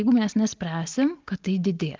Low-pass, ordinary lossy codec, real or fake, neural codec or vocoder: 7.2 kHz; Opus, 32 kbps; real; none